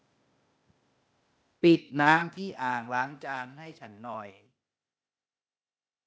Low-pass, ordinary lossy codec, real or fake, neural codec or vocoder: none; none; fake; codec, 16 kHz, 0.8 kbps, ZipCodec